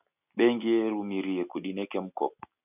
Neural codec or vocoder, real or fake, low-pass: none; real; 3.6 kHz